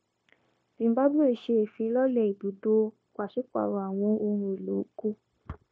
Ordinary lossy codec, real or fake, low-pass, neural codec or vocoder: none; fake; none; codec, 16 kHz, 0.9 kbps, LongCat-Audio-Codec